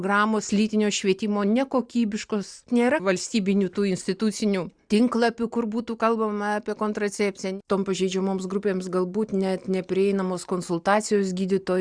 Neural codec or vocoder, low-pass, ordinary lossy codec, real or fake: none; 9.9 kHz; Opus, 64 kbps; real